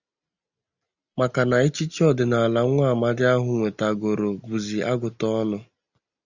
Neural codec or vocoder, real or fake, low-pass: none; real; 7.2 kHz